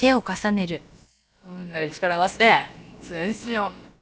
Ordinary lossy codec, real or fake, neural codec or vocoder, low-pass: none; fake; codec, 16 kHz, about 1 kbps, DyCAST, with the encoder's durations; none